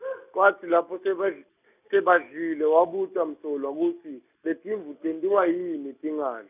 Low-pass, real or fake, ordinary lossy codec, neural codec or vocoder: 3.6 kHz; real; none; none